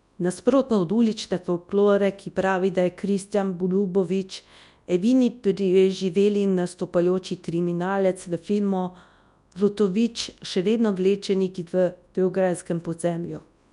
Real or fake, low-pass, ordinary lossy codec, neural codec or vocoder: fake; 10.8 kHz; none; codec, 24 kHz, 0.9 kbps, WavTokenizer, large speech release